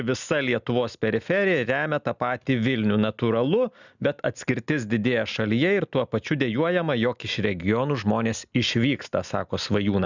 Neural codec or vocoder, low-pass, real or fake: none; 7.2 kHz; real